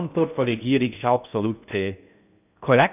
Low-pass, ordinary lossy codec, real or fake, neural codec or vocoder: 3.6 kHz; none; fake; codec, 16 kHz in and 24 kHz out, 0.6 kbps, FocalCodec, streaming, 2048 codes